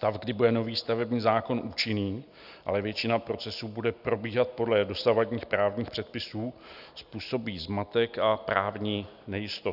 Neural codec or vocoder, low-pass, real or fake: none; 5.4 kHz; real